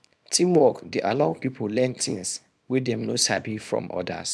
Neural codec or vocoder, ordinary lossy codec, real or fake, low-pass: codec, 24 kHz, 0.9 kbps, WavTokenizer, small release; none; fake; none